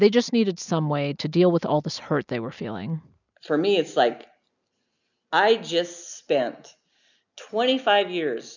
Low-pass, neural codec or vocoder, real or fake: 7.2 kHz; none; real